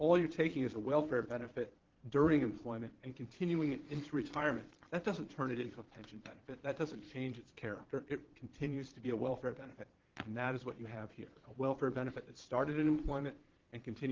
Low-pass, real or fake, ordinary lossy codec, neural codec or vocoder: 7.2 kHz; fake; Opus, 16 kbps; vocoder, 22.05 kHz, 80 mel bands, Vocos